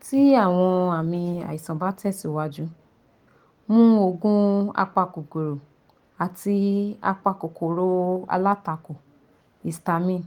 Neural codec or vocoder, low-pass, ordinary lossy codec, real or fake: none; 19.8 kHz; Opus, 24 kbps; real